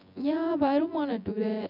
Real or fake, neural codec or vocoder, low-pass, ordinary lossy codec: fake; vocoder, 24 kHz, 100 mel bands, Vocos; 5.4 kHz; none